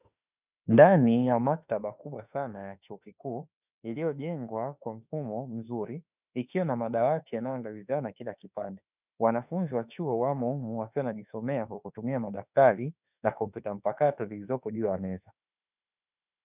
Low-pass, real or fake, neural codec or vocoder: 3.6 kHz; fake; autoencoder, 48 kHz, 32 numbers a frame, DAC-VAE, trained on Japanese speech